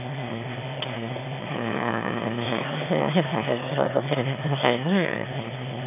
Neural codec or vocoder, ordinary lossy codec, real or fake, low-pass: autoencoder, 22.05 kHz, a latent of 192 numbers a frame, VITS, trained on one speaker; none; fake; 3.6 kHz